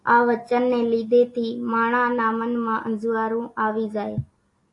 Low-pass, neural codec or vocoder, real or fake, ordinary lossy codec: 10.8 kHz; none; real; AAC, 48 kbps